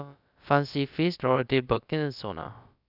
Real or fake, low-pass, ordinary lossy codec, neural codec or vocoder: fake; 5.4 kHz; none; codec, 16 kHz, about 1 kbps, DyCAST, with the encoder's durations